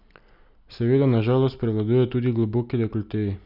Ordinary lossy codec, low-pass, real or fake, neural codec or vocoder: none; 5.4 kHz; real; none